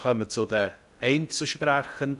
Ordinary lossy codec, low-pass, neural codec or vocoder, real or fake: none; 10.8 kHz; codec, 16 kHz in and 24 kHz out, 0.6 kbps, FocalCodec, streaming, 2048 codes; fake